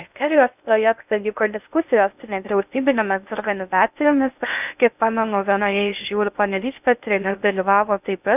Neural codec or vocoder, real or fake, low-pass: codec, 16 kHz in and 24 kHz out, 0.6 kbps, FocalCodec, streaming, 2048 codes; fake; 3.6 kHz